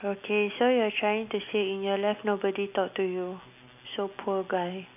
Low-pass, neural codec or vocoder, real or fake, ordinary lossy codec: 3.6 kHz; none; real; none